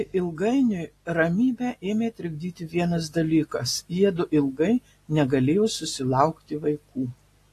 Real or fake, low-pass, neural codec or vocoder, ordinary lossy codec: real; 14.4 kHz; none; AAC, 48 kbps